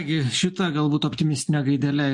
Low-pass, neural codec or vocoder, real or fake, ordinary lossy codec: 10.8 kHz; none; real; MP3, 64 kbps